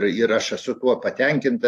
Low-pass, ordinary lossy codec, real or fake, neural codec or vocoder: 14.4 kHz; AAC, 64 kbps; real; none